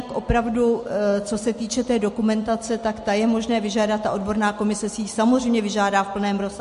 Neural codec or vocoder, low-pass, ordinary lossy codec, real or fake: none; 10.8 kHz; MP3, 48 kbps; real